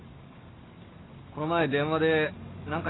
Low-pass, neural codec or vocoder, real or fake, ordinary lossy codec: 7.2 kHz; none; real; AAC, 16 kbps